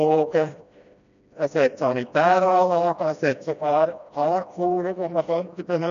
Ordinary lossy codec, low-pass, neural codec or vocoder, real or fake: AAC, 96 kbps; 7.2 kHz; codec, 16 kHz, 1 kbps, FreqCodec, smaller model; fake